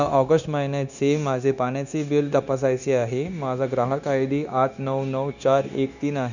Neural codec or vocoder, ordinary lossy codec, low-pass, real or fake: codec, 16 kHz, 0.9 kbps, LongCat-Audio-Codec; none; 7.2 kHz; fake